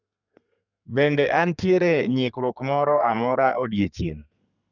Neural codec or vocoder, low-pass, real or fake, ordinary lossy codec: codec, 32 kHz, 1.9 kbps, SNAC; 7.2 kHz; fake; none